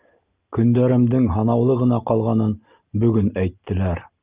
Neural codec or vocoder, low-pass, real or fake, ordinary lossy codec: none; 3.6 kHz; real; Opus, 32 kbps